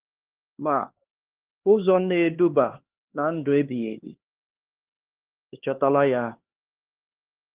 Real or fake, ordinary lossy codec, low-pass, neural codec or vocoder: fake; Opus, 16 kbps; 3.6 kHz; codec, 16 kHz, 2 kbps, X-Codec, HuBERT features, trained on LibriSpeech